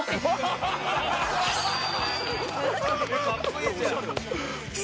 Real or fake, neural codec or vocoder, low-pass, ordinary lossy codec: real; none; none; none